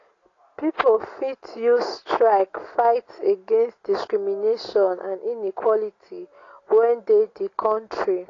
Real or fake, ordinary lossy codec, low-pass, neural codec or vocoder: real; AAC, 32 kbps; 7.2 kHz; none